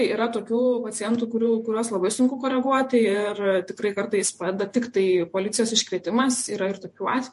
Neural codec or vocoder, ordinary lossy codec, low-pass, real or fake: vocoder, 48 kHz, 128 mel bands, Vocos; MP3, 48 kbps; 14.4 kHz; fake